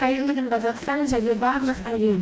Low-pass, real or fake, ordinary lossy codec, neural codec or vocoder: none; fake; none; codec, 16 kHz, 1 kbps, FreqCodec, smaller model